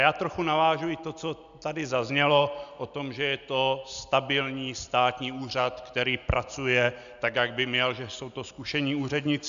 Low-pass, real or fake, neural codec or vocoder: 7.2 kHz; real; none